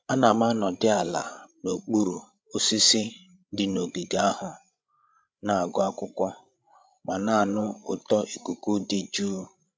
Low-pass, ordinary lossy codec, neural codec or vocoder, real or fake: none; none; codec, 16 kHz, 16 kbps, FreqCodec, larger model; fake